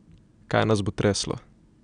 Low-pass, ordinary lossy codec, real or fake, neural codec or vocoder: 9.9 kHz; none; real; none